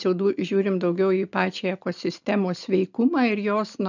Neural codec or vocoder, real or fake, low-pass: none; real; 7.2 kHz